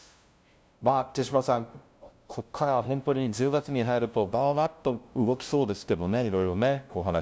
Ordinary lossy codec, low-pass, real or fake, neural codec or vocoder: none; none; fake; codec, 16 kHz, 0.5 kbps, FunCodec, trained on LibriTTS, 25 frames a second